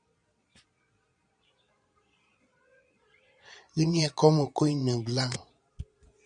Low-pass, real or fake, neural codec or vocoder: 9.9 kHz; fake; vocoder, 22.05 kHz, 80 mel bands, Vocos